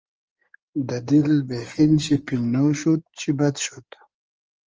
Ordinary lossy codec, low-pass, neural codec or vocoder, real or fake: Opus, 24 kbps; 7.2 kHz; codec, 16 kHz in and 24 kHz out, 2.2 kbps, FireRedTTS-2 codec; fake